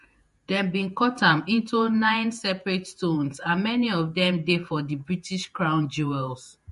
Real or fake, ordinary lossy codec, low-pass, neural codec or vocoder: fake; MP3, 48 kbps; 14.4 kHz; vocoder, 48 kHz, 128 mel bands, Vocos